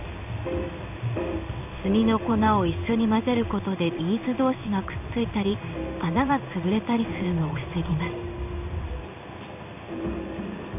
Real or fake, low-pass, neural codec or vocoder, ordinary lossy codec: fake; 3.6 kHz; codec, 16 kHz in and 24 kHz out, 1 kbps, XY-Tokenizer; none